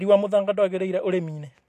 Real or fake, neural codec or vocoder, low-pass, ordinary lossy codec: real; none; 14.4 kHz; AAC, 64 kbps